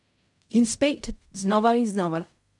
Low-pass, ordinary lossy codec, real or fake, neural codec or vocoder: 10.8 kHz; MP3, 96 kbps; fake; codec, 16 kHz in and 24 kHz out, 0.4 kbps, LongCat-Audio-Codec, fine tuned four codebook decoder